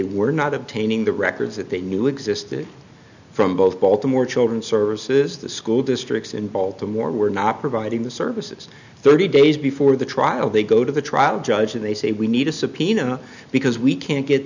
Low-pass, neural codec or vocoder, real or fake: 7.2 kHz; none; real